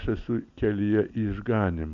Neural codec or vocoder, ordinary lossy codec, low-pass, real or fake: none; MP3, 96 kbps; 7.2 kHz; real